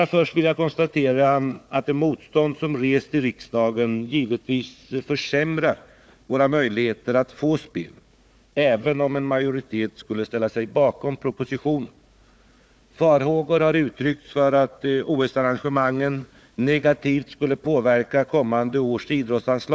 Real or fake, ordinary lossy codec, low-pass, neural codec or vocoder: fake; none; none; codec, 16 kHz, 4 kbps, FunCodec, trained on Chinese and English, 50 frames a second